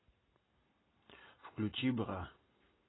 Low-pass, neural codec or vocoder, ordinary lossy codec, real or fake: 7.2 kHz; none; AAC, 16 kbps; real